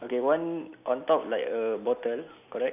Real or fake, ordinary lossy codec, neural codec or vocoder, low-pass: real; none; none; 3.6 kHz